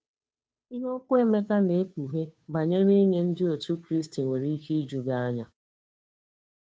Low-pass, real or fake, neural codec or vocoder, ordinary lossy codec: none; fake; codec, 16 kHz, 2 kbps, FunCodec, trained on Chinese and English, 25 frames a second; none